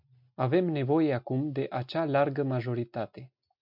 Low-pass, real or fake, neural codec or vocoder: 5.4 kHz; real; none